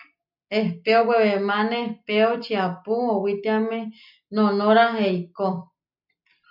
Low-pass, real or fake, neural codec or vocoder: 5.4 kHz; real; none